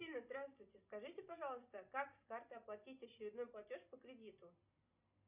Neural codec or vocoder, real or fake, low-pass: none; real; 3.6 kHz